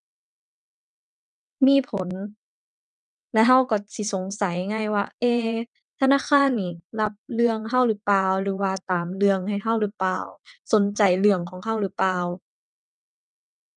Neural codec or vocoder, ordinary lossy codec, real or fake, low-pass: vocoder, 22.05 kHz, 80 mel bands, WaveNeXt; none; fake; 9.9 kHz